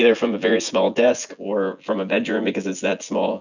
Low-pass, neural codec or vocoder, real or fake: 7.2 kHz; vocoder, 24 kHz, 100 mel bands, Vocos; fake